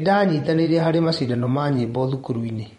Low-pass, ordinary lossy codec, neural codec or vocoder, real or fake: 10.8 kHz; MP3, 48 kbps; vocoder, 24 kHz, 100 mel bands, Vocos; fake